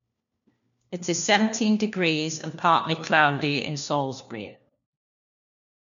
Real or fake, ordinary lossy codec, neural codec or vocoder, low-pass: fake; none; codec, 16 kHz, 1 kbps, FunCodec, trained on LibriTTS, 50 frames a second; 7.2 kHz